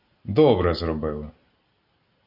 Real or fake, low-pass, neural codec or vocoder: real; 5.4 kHz; none